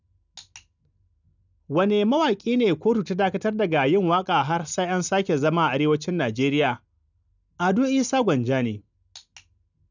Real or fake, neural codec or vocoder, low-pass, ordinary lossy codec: real; none; 7.2 kHz; none